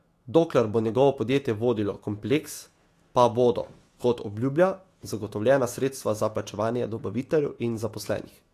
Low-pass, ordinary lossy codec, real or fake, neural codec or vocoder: 14.4 kHz; AAC, 48 kbps; fake; autoencoder, 48 kHz, 128 numbers a frame, DAC-VAE, trained on Japanese speech